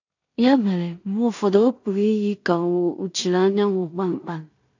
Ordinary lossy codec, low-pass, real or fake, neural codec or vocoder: AAC, 48 kbps; 7.2 kHz; fake; codec, 16 kHz in and 24 kHz out, 0.4 kbps, LongCat-Audio-Codec, two codebook decoder